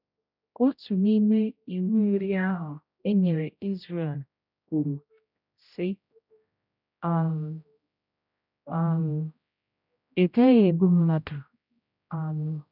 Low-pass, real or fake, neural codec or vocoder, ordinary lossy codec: 5.4 kHz; fake; codec, 16 kHz, 0.5 kbps, X-Codec, HuBERT features, trained on general audio; none